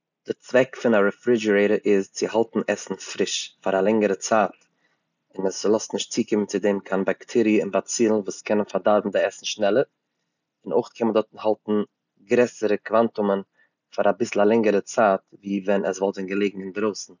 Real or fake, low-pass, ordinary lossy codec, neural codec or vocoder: real; 7.2 kHz; none; none